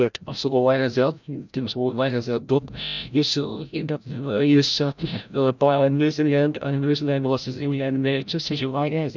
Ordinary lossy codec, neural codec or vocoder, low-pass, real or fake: none; codec, 16 kHz, 0.5 kbps, FreqCodec, larger model; 7.2 kHz; fake